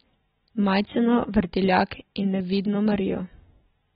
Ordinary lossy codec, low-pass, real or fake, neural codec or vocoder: AAC, 16 kbps; 19.8 kHz; real; none